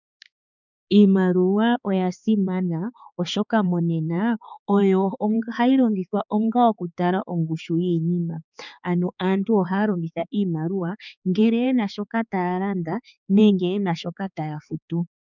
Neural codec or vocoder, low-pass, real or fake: codec, 16 kHz, 4 kbps, X-Codec, HuBERT features, trained on balanced general audio; 7.2 kHz; fake